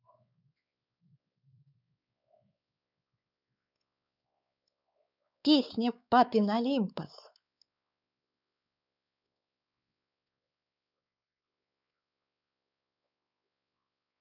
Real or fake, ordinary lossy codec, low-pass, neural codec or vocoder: fake; none; 5.4 kHz; codec, 16 kHz, 4 kbps, X-Codec, WavLM features, trained on Multilingual LibriSpeech